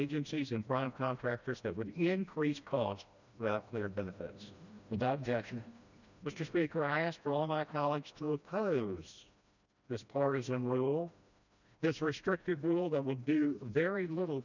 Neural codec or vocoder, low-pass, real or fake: codec, 16 kHz, 1 kbps, FreqCodec, smaller model; 7.2 kHz; fake